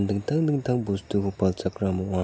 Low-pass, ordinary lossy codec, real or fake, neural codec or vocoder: none; none; real; none